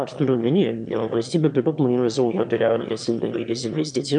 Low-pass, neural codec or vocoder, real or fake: 9.9 kHz; autoencoder, 22.05 kHz, a latent of 192 numbers a frame, VITS, trained on one speaker; fake